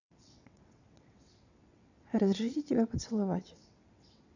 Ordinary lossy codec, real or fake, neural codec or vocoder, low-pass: none; fake; vocoder, 22.05 kHz, 80 mel bands, WaveNeXt; 7.2 kHz